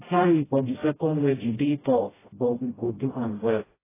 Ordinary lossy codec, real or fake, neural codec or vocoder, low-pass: AAC, 16 kbps; fake; codec, 16 kHz, 0.5 kbps, FreqCodec, smaller model; 3.6 kHz